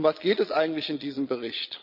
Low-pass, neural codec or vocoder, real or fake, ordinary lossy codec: 5.4 kHz; vocoder, 22.05 kHz, 80 mel bands, Vocos; fake; AAC, 48 kbps